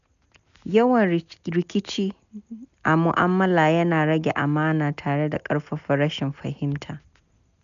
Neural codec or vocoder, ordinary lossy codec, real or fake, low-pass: none; none; real; 7.2 kHz